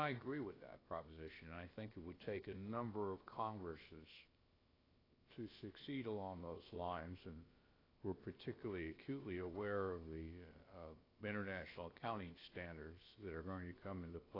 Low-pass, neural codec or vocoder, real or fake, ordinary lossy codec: 5.4 kHz; codec, 16 kHz, about 1 kbps, DyCAST, with the encoder's durations; fake; AAC, 24 kbps